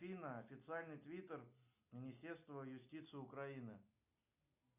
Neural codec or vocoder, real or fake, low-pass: none; real; 3.6 kHz